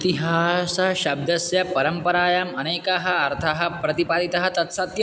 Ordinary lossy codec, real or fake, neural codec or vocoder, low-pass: none; real; none; none